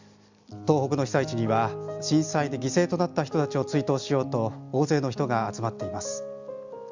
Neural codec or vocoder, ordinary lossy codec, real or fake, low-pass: none; Opus, 64 kbps; real; 7.2 kHz